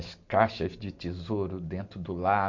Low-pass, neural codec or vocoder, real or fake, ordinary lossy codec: 7.2 kHz; none; real; MP3, 48 kbps